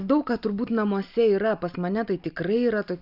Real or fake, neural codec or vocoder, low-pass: real; none; 5.4 kHz